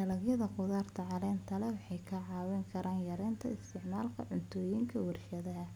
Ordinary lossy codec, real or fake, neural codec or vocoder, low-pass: none; real; none; 19.8 kHz